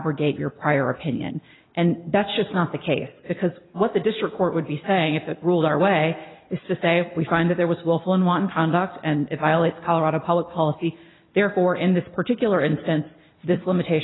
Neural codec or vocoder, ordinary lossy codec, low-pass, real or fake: none; AAC, 16 kbps; 7.2 kHz; real